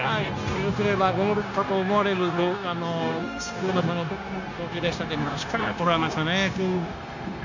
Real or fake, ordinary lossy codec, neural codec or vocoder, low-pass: fake; none; codec, 16 kHz, 0.9 kbps, LongCat-Audio-Codec; 7.2 kHz